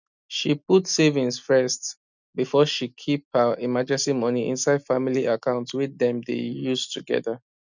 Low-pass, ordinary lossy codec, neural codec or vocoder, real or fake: 7.2 kHz; none; none; real